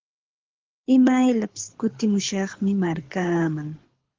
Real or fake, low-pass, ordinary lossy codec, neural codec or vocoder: fake; 7.2 kHz; Opus, 16 kbps; codec, 24 kHz, 6 kbps, HILCodec